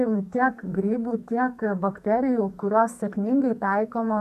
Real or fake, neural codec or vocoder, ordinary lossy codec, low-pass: fake; codec, 44.1 kHz, 2.6 kbps, SNAC; AAC, 96 kbps; 14.4 kHz